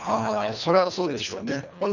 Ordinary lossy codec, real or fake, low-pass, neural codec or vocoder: none; fake; 7.2 kHz; codec, 24 kHz, 1.5 kbps, HILCodec